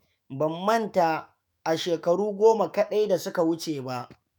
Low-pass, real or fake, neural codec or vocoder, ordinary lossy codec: none; fake; autoencoder, 48 kHz, 128 numbers a frame, DAC-VAE, trained on Japanese speech; none